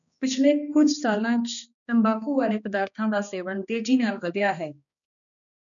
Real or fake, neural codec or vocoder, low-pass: fake; codec, 16 kHz, 2 kbps, X-Codec, HuBERT features, trained on balanced general audio; 7.2 kHz